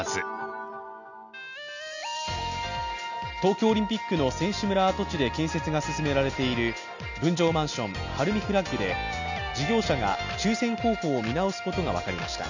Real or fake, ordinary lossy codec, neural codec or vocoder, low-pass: real; none; none; 7.2 kHz